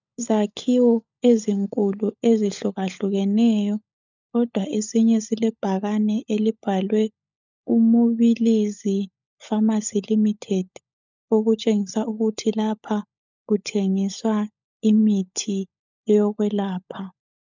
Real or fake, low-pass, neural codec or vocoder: fake; 7.2 kHz; codec, 16 kHz, 16 kbps, FunCodec, trained on LibriTTS, 50 frames a second